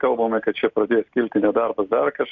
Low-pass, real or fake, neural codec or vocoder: 7.2 kHz; real; none